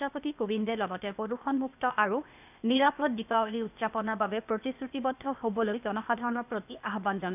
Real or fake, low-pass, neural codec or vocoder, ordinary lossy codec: fake; 3.6 kHz; codec, 16 kHz, 0.8 kbps, ZipCodec; none